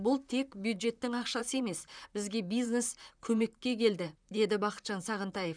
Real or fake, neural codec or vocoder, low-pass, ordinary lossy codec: fake; vocoder, 44.1 kHz, 128 mel bands, Pupu-Vocoder; 9.9 kHz; none